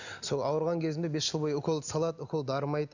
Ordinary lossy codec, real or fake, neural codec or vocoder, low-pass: none; real; none; 7.2 kHz